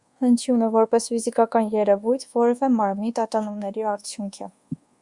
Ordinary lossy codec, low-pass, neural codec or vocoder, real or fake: Opus, 64 kbps; 10.8 kHz; codec, 24 kHz, 1.2 kbps, DualCodec; fake